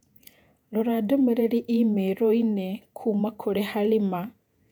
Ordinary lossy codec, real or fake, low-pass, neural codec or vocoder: none; fake; 19.8 kHz; vocoder, 48 kHz, 128 mel bands, Vocos